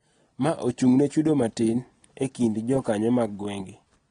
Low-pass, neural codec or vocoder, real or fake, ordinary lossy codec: 9.9 kHz; none; real; AAC, 32 kbps